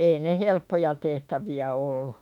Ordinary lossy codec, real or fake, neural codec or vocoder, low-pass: none; fake; autoencoder, 48 kHz, 32 numbers a frame, DAC-VAE, trained on Japanese speech; 19.8 kHz